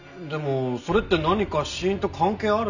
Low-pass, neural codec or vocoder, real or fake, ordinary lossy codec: 7.2 kHz; none; real; none